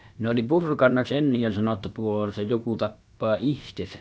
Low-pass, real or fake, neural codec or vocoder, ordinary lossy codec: none; fake; codec, 16 kHz, about 1 kbps, DyCAST, with the encoder's durations; none